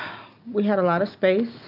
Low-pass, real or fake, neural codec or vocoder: 5.4 kHz; real; none